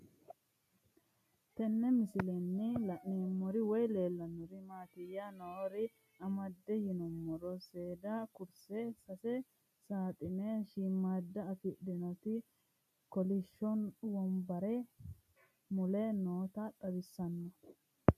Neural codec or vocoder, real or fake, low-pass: none; real; 14.4 kHz